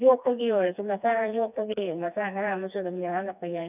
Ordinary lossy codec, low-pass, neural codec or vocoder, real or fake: none; 3.6 kHz; codec, 16 kHz, 2 kbps, FreqCodec, smaller model; fake